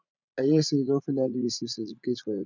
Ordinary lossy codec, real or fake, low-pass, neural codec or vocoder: none; fake; 7.2 kHz; vocoder, 44.1 kHz, 80 mel bands, Vocos